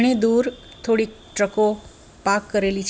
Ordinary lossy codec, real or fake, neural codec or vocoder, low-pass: none; real; none; none